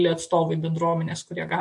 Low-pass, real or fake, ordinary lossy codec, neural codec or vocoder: 10.8 kHz; real; MP3, 48 kbps; none